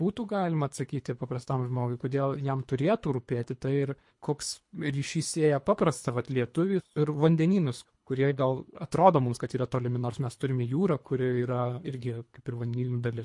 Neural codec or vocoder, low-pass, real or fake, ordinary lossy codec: codec, 24 kHz, 3 kbps, HILCodec; 10.8 kHz; fake; MP3, 48 kbps